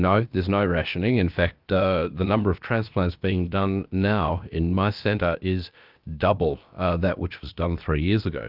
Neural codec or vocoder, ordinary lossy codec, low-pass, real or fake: codec, 16 kHz, about 1 kbps, DyCAST, with the encoder's durations; Opus, 24 kbps; 5.4 kHz; fake